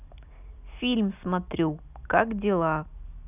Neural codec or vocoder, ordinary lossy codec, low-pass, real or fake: none; none; 3.6 kHz; real